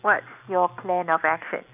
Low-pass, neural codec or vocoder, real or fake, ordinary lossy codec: 3.6 kHz; none; real; none